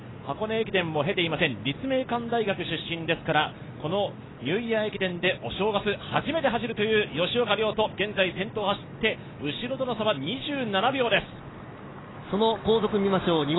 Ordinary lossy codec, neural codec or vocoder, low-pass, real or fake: AAC, 16 kbps; none; 7.2 kHz; real